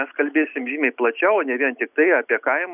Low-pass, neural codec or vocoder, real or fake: 3.6 kHz; none; real